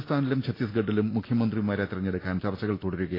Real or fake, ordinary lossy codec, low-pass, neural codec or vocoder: real; AAC, 24 kbps; 5.4 kHz; none